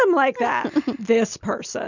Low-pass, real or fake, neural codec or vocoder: 7.2 kHz; real; none